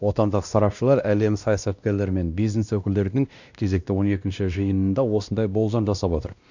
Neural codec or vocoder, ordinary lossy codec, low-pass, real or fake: codec, 16 kHz, 1 kbps, X-Codec, WavLM features, trained on Multilingual LibriSpeech; none; 7.2 kHz; fake